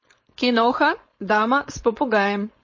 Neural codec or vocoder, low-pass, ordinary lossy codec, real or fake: codec, 16 kHz, 4.8 kbps, FACodec; 7.2 kHz; MP3, 32 kbps; fake